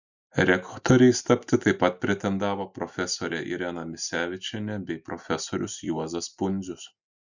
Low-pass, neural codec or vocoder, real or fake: 7.2 kHz; none; real